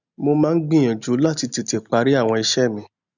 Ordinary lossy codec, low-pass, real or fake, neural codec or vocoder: none; 7.2 kHz; real; none